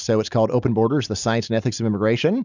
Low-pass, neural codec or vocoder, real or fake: 7.2 kHz; none; real